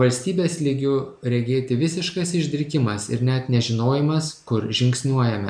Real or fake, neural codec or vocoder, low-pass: real; none; 9.9 kHz